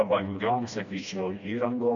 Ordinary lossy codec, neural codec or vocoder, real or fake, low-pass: AAC, 64 kbps; codec, 16 kHz, 1 kbps, FreqCodec, smaller model; fake; 7.2 kHz